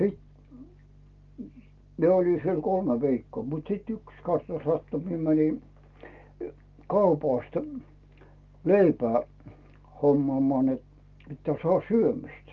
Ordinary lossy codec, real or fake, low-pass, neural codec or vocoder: Opus, 24 kbps; real; 7.2 kHz; none